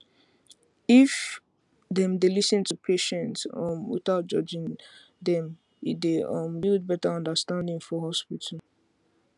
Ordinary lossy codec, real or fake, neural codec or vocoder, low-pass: none; real; none; 10.8 kHz